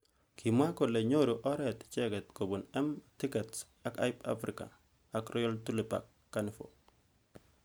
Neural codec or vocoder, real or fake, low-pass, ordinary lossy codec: none; real; none; none